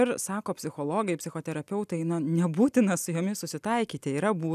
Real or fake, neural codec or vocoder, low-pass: real; none; 14.4 kHz